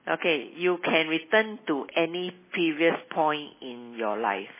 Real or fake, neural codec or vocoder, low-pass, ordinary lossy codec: real; none; 3.6 kHz; MP3, 16 kbps